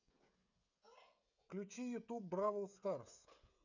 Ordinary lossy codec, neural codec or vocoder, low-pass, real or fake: none; codec, 16 kHz, 16 kbps, FreqCodec, smaller model; 7.2 kHz; fake